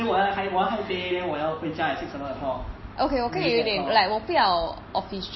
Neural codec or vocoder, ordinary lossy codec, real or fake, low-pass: none; MP3, 24 kbps; real; 7.2 kHz